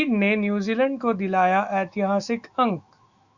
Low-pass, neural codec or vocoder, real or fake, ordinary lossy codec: 7.2 kHz; autoencoder, 48 kHz, 128 numbers a frame, DAC-VAE, trained on Japanese speech; fake; Opus, 64 kbps